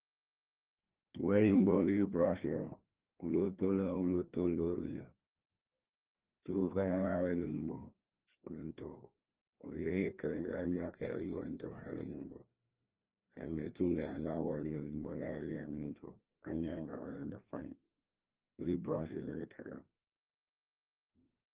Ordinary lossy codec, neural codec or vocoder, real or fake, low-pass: Opus, 16 kbps; codec, 16 kHz, 2 kbps, FreqCodec, larger model; fake; 3.6 kHz